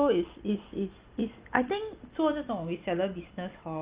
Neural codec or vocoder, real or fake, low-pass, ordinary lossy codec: none; real; 3.6 kHz; Opus, 32 kbps